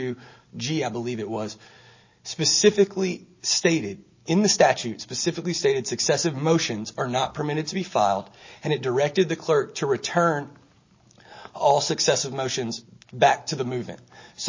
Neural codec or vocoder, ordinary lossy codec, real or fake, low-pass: none; MP3, 32 kbps; real; 7.2 kHz